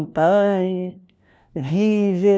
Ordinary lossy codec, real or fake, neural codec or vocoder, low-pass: none; fake; codec, 16 kHz, 1 kbps, FunCodec, trained on LibriTTS, 50 frames a second; none